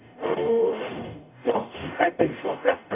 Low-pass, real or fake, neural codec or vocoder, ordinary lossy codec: 3.6 kHz; fake; codec, 44.1 kHz, 0.9 kbps, DAC; none